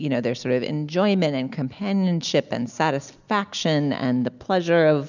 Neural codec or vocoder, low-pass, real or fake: none; 7.2 kHz; real